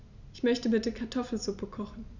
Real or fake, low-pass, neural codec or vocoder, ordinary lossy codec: real; 7.2 kHz; none; none